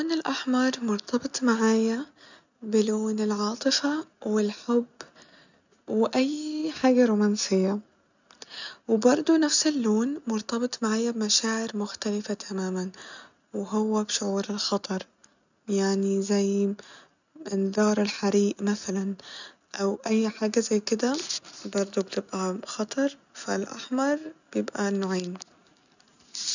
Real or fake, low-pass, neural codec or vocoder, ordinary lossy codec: real; 7.2 kHz; none; none